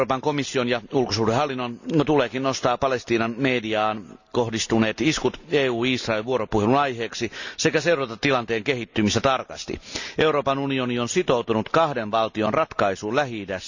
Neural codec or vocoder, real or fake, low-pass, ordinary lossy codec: none; real; 7.2 kHz; none